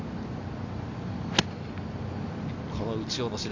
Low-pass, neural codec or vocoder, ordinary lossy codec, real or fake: 7.2 kHz; none; none; real